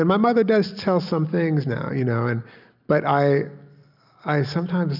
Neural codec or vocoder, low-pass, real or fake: none; 5.4 kHz; real